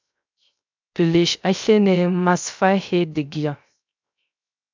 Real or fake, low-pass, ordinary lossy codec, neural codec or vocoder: fake; 7.2 kHz; MP3, 64 kbps; codec, 16 kHz, 0.3 kbps, FocalCodec